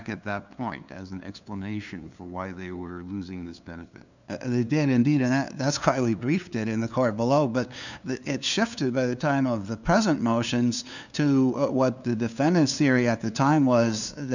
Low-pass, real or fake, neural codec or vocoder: 7.2 kHz; fake; codec, 16 kHz, 2 kbps, FunCodec, trained on LibriTTS, 25 frames a second